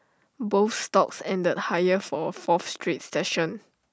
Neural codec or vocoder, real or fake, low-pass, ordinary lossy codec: none; real; none; none